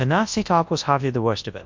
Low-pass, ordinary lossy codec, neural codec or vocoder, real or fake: 7.2 kHz; MP3, 48 kbps; codec, 24 kHz, 0.9 kbps, WavTokenizer, large speech release; fake